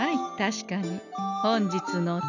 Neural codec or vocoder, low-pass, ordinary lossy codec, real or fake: none; 7.2 kHz; none; real